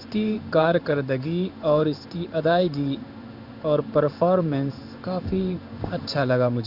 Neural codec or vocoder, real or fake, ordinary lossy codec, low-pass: codec, 16 kHz in and 24 kHz out, 1 kbps, XY-Tokenizer; fake; none; 5.4 kHz